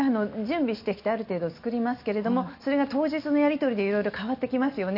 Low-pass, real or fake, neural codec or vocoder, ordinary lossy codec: 5.4 kHz; real; none; none